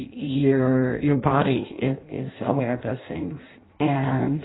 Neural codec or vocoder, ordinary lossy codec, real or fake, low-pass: codec, 16 kHz in and 24 kHz out, 0.6 kbps, FireRedTTS-2 codec; AAC, 16 kbps; fake; 7.2 kHz